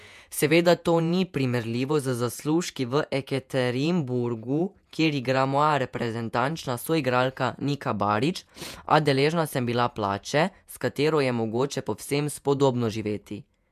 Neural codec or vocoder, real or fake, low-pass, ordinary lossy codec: vocoder, 48 kHz, 128 mel bands, Vocos; fake; 14.4 kHz; MP3, 96 kbps